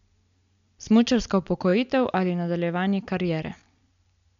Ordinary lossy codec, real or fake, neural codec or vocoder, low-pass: MP3, 64 kbps; real; none; 7.2 kHz